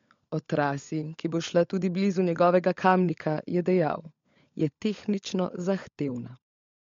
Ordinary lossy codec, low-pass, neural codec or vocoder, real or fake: MP3, 48 kbps; 7.2 kHz; codec, 16 kHz, 16 kbps, FunCodec, trained on LibriTTS, 50 frames a second; fake